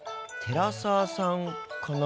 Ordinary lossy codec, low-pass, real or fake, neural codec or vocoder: none; none; real; none